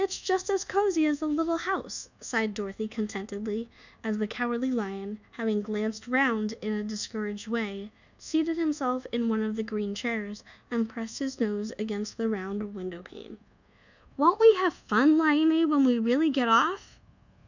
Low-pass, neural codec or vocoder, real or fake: 7.2 kHz; codec, 24 kHz, 1.2 kbps, DualCodec; fake